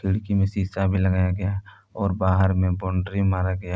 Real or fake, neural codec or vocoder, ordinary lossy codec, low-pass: real; none; none; none